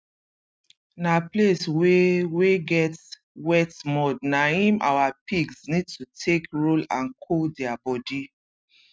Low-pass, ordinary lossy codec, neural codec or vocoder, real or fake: none; none; none; real